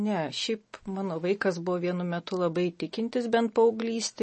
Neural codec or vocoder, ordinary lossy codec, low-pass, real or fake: none; MP3, 32 kbps; 10.8 kHz; real